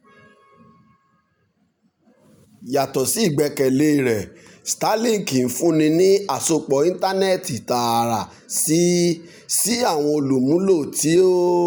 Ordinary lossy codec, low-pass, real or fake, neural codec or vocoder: none; none; real; none